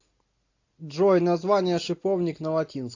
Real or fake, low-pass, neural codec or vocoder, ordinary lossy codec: real; 7.2 kHz; none; AAC, 48 kbps